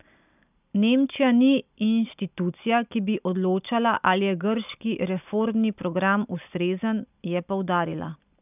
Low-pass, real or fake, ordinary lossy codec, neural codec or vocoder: 3.6 kHz; real; none; none